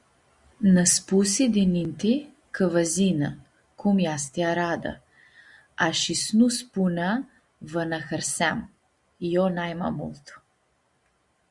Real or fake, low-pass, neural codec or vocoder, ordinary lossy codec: real; 10.8 kHz; none; Opus, 64 kbps